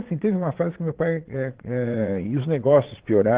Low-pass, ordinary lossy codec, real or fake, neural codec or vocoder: 3.6 kHz; Opus, 16 kbps; fake; vocoder, 22.05 kHz, 80 mel bands, Vocos